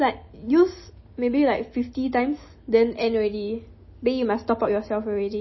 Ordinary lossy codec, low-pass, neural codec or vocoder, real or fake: MP3, 24 kbps; 7.2 kHz; none; real